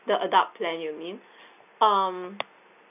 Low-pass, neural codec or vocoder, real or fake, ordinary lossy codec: 3.6 kHz; none; real; none